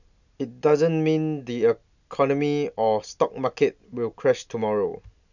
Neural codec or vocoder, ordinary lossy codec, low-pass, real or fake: none; none; 7.2 kHz; real